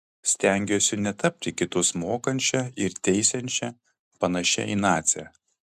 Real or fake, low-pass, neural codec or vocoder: real; 14.4 kHz; none